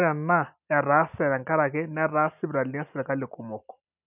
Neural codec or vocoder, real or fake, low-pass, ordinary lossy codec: none; real; 3.6 kHz; none